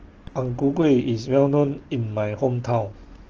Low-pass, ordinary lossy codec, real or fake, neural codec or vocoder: 7.2 kHz; Opus, 16 kbps; real; none